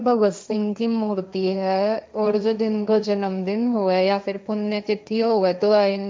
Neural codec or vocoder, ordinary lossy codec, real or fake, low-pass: codec, 16 kHz, 1.1 kbps, Voila-Tokenizer; none; fake; none